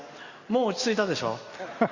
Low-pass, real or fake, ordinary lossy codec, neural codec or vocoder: 7.2 kHz; real; Opus, 64 kbps; none